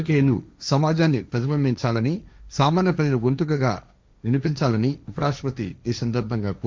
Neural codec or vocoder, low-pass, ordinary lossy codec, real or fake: codec, 16 kHz, 1.1 kbps, Voila-Tokenizer; none; none; fake